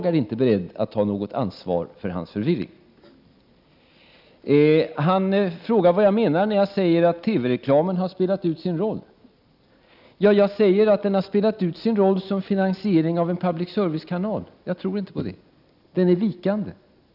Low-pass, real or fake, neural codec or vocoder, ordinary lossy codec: 5.4 kHz; real; none; none